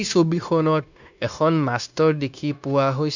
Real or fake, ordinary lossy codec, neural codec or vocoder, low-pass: fake; none; codec, 16 kHz, 0.9 kbps, LongCat-Audio-Codec; 7.2 kHz